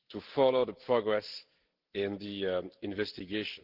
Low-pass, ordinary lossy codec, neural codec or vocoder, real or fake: 5.4 kHz; Opus, 16 kbps; none; real